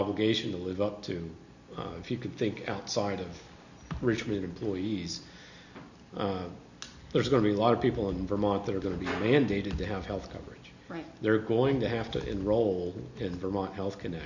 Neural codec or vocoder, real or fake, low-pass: none; real; 7.2 kHz